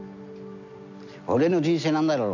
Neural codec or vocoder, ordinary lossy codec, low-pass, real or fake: none; none; 7.2 kHz; real